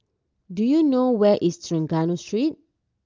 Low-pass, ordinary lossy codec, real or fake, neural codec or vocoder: 7.2 kHz; Opus, 32 kbps; real; none